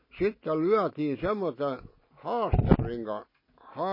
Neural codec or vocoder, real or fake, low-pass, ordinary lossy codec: none; real; 5.4 kHz; MP3, 24 kbps